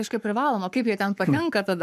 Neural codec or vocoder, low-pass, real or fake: codec, 44.1 kHz, 7.8 kbps, Pupu-Codec; 14.4 kHz; fake